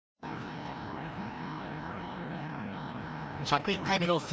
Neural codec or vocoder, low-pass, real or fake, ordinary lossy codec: codec, 16 kHz, 1 kbps, FreqCodec, larger model; none; fake; none